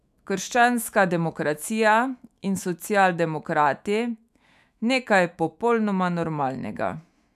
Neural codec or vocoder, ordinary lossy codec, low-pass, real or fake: autoencoder, 48 kHz, 128 numbers a frame, DAC-VAE, trained on Japanese speech; none; 14.4 kHz; fake